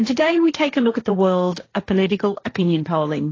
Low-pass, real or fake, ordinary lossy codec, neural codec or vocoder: 7.2 kHz; fake; AAC, 32 kbps; codec, 16 kHz, 1.1 kbps, Voila-Tokenizer